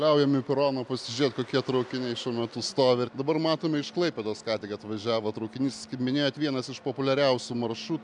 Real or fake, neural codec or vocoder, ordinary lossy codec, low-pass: real; none; MP3, 96 kbps; 10.8 kHz